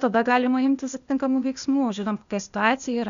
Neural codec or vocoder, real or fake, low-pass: codec, 16 kHz, 0.8 kbps, ZipCodec; fake; 7.2 kHz